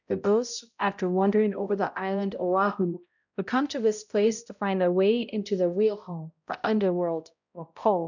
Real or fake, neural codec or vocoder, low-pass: fake; codec, 16 kHz, 0.5 kbps, X-Codec, HuBERT features, trained on balanced general audio; 7.2 kHz